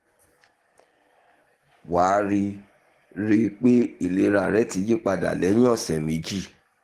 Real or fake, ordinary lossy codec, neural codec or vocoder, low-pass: fake; Opus, 16 kbps; codec, 44.1 kHz, 7.8 kbps, DAC; 14.4 kHz